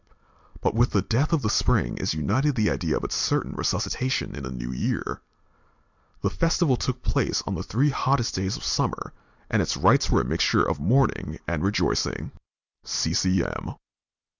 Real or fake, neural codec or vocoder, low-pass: real; none; 7.2 kHz